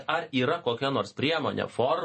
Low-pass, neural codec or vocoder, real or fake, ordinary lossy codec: 10.8 kHz; none; real; MP3, 32 kbps